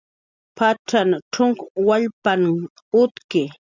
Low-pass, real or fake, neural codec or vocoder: 7.2 kHz; real; none